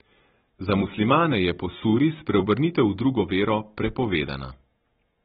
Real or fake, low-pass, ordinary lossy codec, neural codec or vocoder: fake; 19.8 kHz; AAC, 16 kbps; vocoder, 44.1 kHz, 128 mel bands every 256 samples, BigVGAN v2